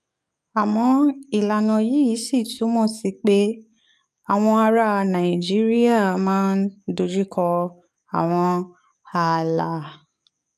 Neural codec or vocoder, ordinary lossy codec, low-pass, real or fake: codec, 44.1 kHz, 7.8 kbps, DAC; none; 14.4 kHz; fake